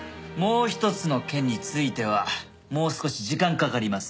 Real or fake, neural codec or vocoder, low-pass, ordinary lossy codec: real; none; none; none